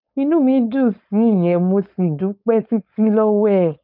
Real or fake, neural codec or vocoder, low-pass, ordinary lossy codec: fake; codec, 16 kHz, 4.8 kbps, FACodec; 5.4 kHz; none